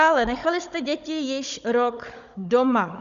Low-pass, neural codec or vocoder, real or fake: 7.2 kHz; codec, 16 kHz, 16 kbps, FunCodec, trained on Chinese and English, 50 frames a second; fake